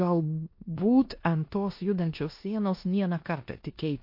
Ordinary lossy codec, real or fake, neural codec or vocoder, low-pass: MP3, 32 kbps; fake; codec, 16 kHz in and 24 kHz out, 0.9 kbps, LongCat-Audio-Codec, four codebook decoder; 5.4 kHz